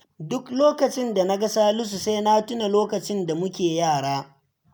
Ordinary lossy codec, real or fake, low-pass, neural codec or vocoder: none; real; none; none